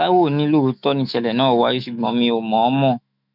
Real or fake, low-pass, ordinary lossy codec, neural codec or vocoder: fake; 5.4 kHz; none; autoencoder, 48 kHz, 128 numbers a frame, DAC-VAE, trained on Japanese speech